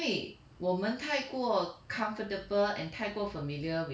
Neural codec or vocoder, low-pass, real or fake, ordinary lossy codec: none; none; real; none